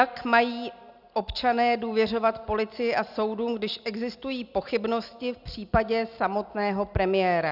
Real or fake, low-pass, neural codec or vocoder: real; 5.4 kHz; none